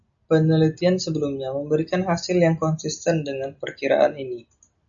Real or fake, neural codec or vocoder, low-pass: real; none; 7.2 kHz